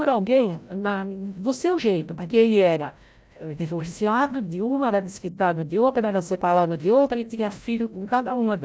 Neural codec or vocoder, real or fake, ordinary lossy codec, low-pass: codec, 16 kHz, 0.5 kbps, FreqCodec, larger model; fake; none; none